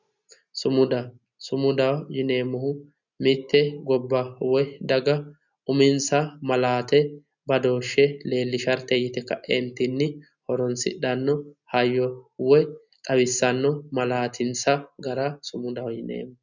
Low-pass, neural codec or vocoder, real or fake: 7.2 kHz; none; real